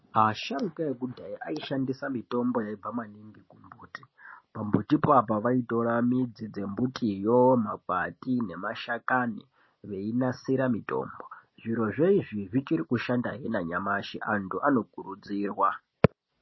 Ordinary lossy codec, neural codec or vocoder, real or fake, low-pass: MP3, 24 kbps; none; real; 7.2 kHz